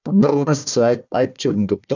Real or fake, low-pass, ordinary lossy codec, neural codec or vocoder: fake; 7.2 kHz; none; codec, 16 kHz, 1 kbps, FunCodec, trained on Chinese and English, 50 frames a second